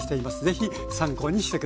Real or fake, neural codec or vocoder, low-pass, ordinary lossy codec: real; none; none; none